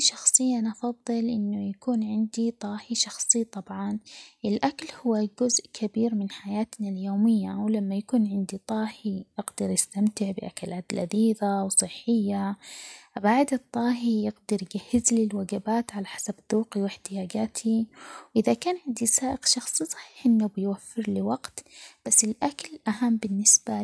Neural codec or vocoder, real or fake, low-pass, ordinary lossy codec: none; real; none; none